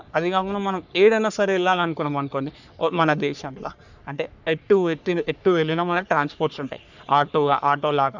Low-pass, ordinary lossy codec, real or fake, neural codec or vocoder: 7.2 kHz; none; fake; codec, 44.1 kHz, 3.4 kbps, Pupu-Codec